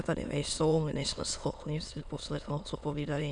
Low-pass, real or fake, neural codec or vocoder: 9.9 kHz; fake; autoencoder, 22.05 kHz, a latent of 192 numbers a frame, VITS, trained on many speakers